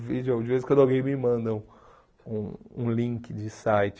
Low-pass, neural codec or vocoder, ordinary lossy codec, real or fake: none; none; none; real